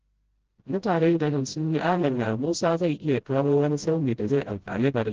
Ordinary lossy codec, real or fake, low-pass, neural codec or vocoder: Opus, 16 kbps; fake; 7.2 kHz; codec, 16 kHz, 0.5 kbps, FreqCodec, smaller model